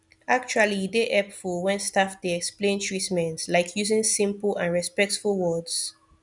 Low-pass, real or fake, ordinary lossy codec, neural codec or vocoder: 10.8 kHz; real; none; none